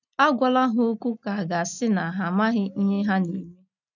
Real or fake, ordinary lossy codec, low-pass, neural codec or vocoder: real; none; 7.2 kHz; none